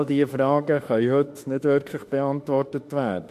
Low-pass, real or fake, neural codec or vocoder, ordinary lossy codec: 14.4 kHz; fake; autoencoder, 48 kHz, 32 numbers a frame, DAC-VAE, trained on Japanese speech; MP3, 64 kbps